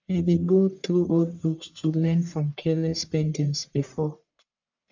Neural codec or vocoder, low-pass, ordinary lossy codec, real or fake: codec, 44.1 kHz, 1.7 kbps, Pupu-Codec; 7.2 kHz; none; fake